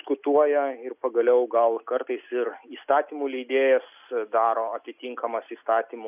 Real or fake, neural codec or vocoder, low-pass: real; none; 3.6 kHz